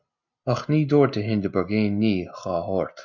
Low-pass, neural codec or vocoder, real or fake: 7.2 kHz; none; real